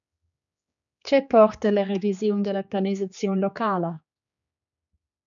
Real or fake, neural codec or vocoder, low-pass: fake; codec, 16 kHz, 4 kbps, X-Codec, HuBERT features, trained on general audio; 7.2 kHz